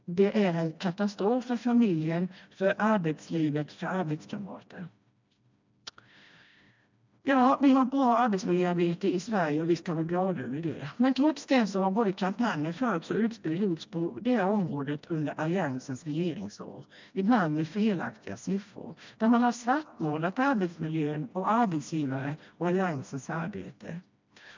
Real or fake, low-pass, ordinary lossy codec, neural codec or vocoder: fake; 7.2 kHz; MP3, 64 kbps; codec, 16 kHz, 1 kbps, FreqCodec, smaller model